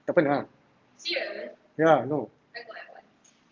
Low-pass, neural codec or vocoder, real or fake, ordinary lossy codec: 7.2 kHz; none; real; Opus, 32 kbps